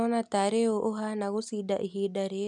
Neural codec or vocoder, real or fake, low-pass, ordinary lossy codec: none; real; 10.8 kHz; none